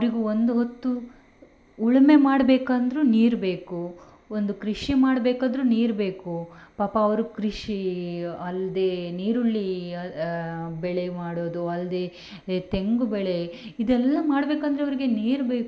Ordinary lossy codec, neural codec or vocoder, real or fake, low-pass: none; none; real; none